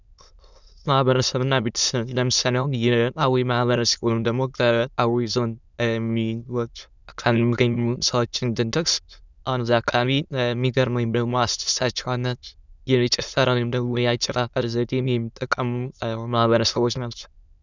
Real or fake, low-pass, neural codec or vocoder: fake; 7.2 kHz; autoencoder, 22.05 kHz, a latent of 192 numbers a frame, VITS, trained on many speakers